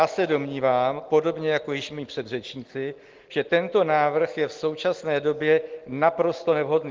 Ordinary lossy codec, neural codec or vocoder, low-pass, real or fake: Opus, 16 kbps; none; 7.2 kHz; real